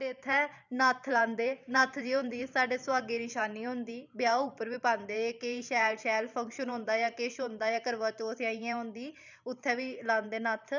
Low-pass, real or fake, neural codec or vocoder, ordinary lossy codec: 7.2 kHz; fake; vocoder, 44.1 kHz, 128 mel bands every 512 samples, BigVGAN v2; none